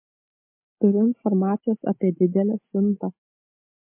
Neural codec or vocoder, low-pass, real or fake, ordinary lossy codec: none; 3.6 kHz; real; MP3, 24 kbps